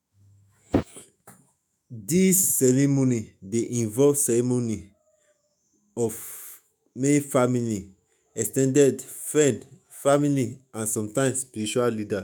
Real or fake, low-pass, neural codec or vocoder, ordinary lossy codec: fake; none; autoencoder, 48 kHz, 128 numbers a frame, DAC-VAE, trained on Japanese speech; none